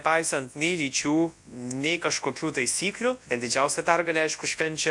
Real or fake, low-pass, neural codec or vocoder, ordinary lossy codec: fake; 10.8 kHz; codec, 24 kHz, 0.9 kbps, WavTokenizer, large speech release; AAC, 64 kbps